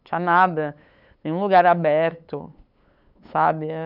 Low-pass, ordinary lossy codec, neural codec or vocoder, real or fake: 5.4 kHz; none; codec, 16 kHz, 8 kbps, FunCodec, trained on LibriTTS, 25 frames a second; fake